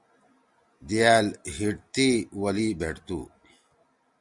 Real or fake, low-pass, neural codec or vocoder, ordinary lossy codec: real; 10.8 kHz; none; Opus, 64 kbps